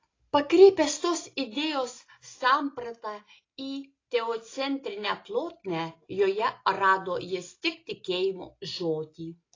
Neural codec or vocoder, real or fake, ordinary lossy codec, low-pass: none; real; AAC, 32 kbps; 7.2 kHz